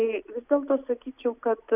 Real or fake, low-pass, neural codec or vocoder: real; 3.6 kHz; none